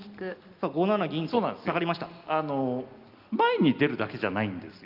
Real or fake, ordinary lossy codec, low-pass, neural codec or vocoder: real; Opus, 32 kbps; 5.4 kHz; none